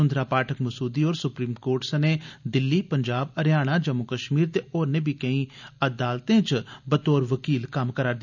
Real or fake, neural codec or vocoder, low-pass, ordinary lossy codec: real; none; 7.2 kHz; none